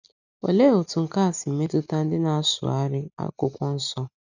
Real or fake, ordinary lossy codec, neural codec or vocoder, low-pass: real; none; none; 7.2 kHz